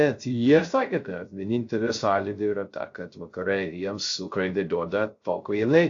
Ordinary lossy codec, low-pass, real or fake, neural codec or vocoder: AAC, 48 kbps; 7.2 kHz; fake; codec, 16 kHz, 0.3 kbps, FocalCodec